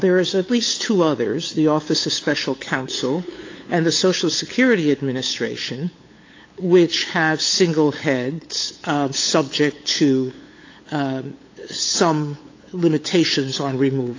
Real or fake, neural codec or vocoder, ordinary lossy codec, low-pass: fake; codec, 16 kHz, 8 kbps, FunCodec, trained on LibriTTS, 25 frames a second; AAC, 32 kbps; 7.2 kHz